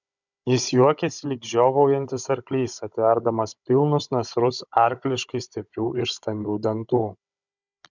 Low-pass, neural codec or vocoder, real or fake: 7.2 kHz; codec, 16 kHz, 16 kbps, FunCodec, trained on Chinese and English, 50 frames a second; fake